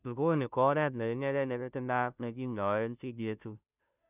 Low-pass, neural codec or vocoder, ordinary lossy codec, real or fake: 3.6 kHz; codec, 16 kHz, 0.5 kbps, FunCodec, trained on Chinese and English, 25 frames a second; none; fake